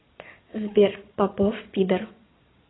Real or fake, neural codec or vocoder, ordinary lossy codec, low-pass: fake; vocoder, 22.05 kHz, 80 mel bands, Vocos; AAC, 16 kbps; 7.2 kHz